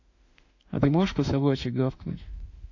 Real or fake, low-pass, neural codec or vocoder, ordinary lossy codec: fake; 7.2 kHz; autoencoder, 48 kHz, 32 numbers a frame, DAC-VAE, trained on Japanese speech; AAC, 48 kbps